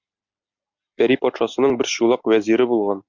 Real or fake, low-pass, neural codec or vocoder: real; 7.2 kHz; none